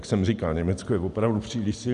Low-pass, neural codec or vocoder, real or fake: 10.8 kHz; none; real